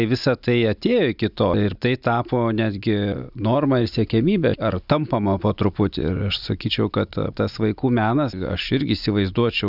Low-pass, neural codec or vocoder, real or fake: 5.4 kHz; none; real